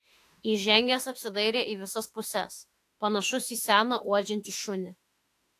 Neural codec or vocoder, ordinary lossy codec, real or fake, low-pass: autoencoder, 48 kHz, 32 numbers a frame, DAC-VAE, trained on Japanese speech; AAC, 64 kbps; fake; 14.4 kHz